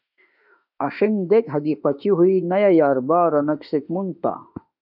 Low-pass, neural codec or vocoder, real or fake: 5.4 kHz; autoencoder, 48 kHz, 32 numbers a frame, DAC-VAE, trained on Japanese speech; fake